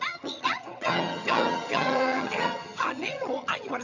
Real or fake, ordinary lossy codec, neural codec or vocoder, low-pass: fake; none; vocoder, 22.05 kHz, 80 mel bands, HiFi-GAN; 7.2 kHz